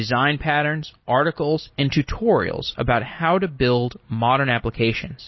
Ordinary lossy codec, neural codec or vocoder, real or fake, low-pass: MP3, 24 kbps; none; real; 7.2 kHz